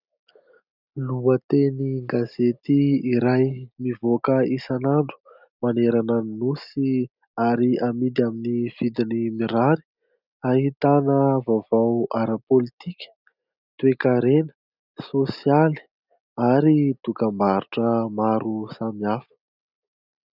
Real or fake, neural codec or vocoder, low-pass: real; none; 5.4 kHz